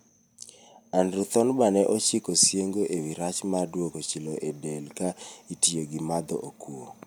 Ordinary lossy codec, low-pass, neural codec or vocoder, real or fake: none; none; none; real